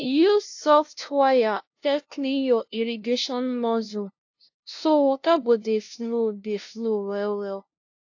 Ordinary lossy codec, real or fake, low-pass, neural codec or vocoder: AAC, 48 kbps; fake; 7.2 kHz; codec, 16 kHz, 0.5 kbps, FunCodec, trained on LibriTTS, 25 frames a second